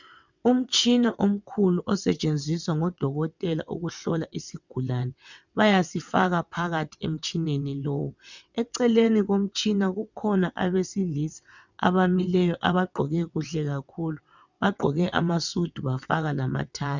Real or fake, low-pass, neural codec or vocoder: fake; 7.2 kHz; vocoder, 22.05 kHz, 80 mel bands, WaveNeXt